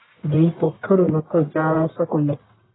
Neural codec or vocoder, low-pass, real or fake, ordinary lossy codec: codec, 44.1 kHz, 1.7 kbps, Pupu-Codec; 7.2 kHz; fake; AAC, 16 kbps